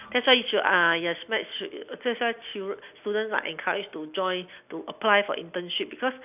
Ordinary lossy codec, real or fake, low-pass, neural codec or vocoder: none; real; 3.6 kHz; none